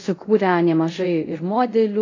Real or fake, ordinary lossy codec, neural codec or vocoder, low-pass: fake; AAC, 32 kbps; codec, 24 kHz, 0.5 kbps, DualCodec; 7.2 kHz